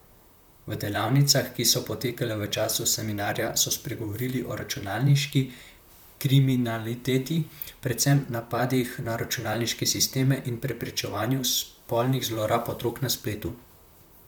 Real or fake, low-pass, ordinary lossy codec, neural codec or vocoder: fake; none; none; vocoder, 44.1 kHz, 128 mel bands, Pupu-Vocoder